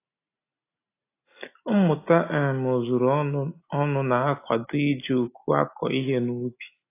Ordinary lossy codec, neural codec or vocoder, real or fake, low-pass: AAC, 24 kbps; none; real; 3.6 kHz